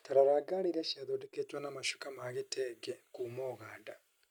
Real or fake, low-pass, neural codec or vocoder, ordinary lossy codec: real; none; none; none